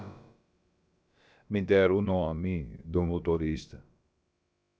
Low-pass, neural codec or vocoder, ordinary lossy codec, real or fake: none; codec, 16 kHz, about 1 kbps, DyCAST, with the encoder's durations; none; fake